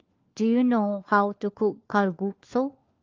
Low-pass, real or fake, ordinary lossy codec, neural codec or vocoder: 7.2 kHz; fake; Opus, 32 kbps; codec, 16 kHz, 4 kbps, FunCodec, trained on LibriTTS, 50 frames a second